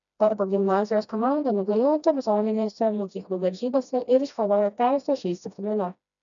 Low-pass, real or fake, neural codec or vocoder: 7.2 kHz; fake; codec, 16 kHz, 1 kbps, FreqCodec, smaller model